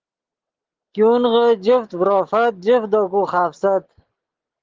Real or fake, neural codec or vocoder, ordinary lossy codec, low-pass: real; none; Opus, 16 kbps; 7.2 kHz